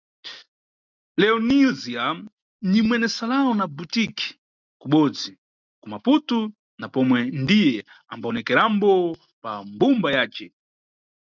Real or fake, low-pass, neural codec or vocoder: real; 7.2 kHz; none